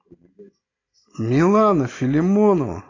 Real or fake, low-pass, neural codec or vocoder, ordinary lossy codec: real; 7.2 kHz; none; AAC, 32 kbps